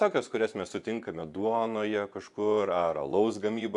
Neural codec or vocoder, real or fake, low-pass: vocoder, 24 kHz, 100 mel bands, Vocos; fake; 10.8 kHz